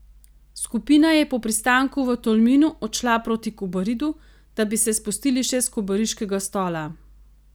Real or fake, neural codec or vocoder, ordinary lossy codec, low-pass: real; none; none; none